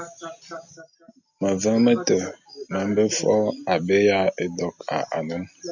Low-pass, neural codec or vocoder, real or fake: 7.2 kHz; none; real